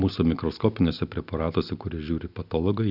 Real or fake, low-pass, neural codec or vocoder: real; 5.4 kHz; none